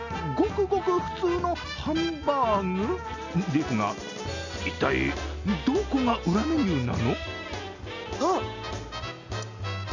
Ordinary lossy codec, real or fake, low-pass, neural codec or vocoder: none; real; 7.2 kHz; none